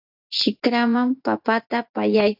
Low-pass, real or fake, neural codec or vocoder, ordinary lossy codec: 5.4 kHz; fake; vocoder, 24 kHz, 100 mel bands, Vocos; AAC, 48 kbps